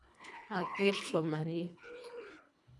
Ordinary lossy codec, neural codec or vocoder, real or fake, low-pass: none; codec, 24 kHz, 1.5 kbps, HILCodec; fake; none